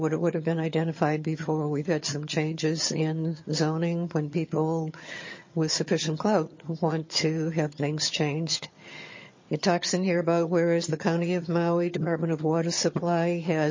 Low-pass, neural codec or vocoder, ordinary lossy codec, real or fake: 7.2 kHz; vocoder, 22.05 kHz, 80 mel bands, HiFi-GAN; MP3, 32 kbps; fake